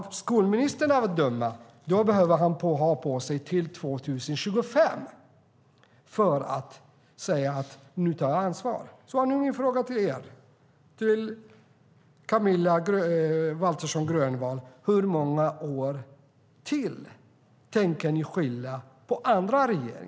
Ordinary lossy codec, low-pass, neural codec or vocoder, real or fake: none; none; none; real